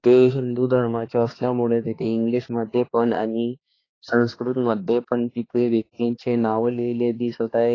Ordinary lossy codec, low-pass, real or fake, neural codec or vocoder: AAC, 32 kbps; 7.2 kHz; fake; codec, 16 kHz, 2 kbps, X-Codec, HuBERT features, trained on balanced general audio